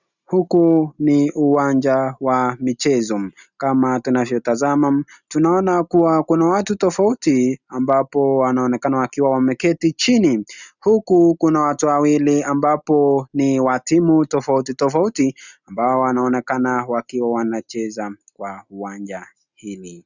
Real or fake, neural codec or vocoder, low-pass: real; none; 7.2 kHz